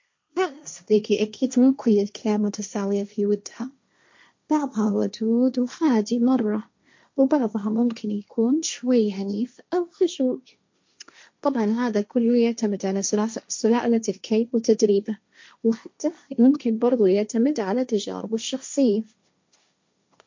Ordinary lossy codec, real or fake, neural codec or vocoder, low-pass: MP3, 48 kbps; fake; codec, 16 kHz, 1.1 kbps, Voila-Tokenizer; 7.2 kHz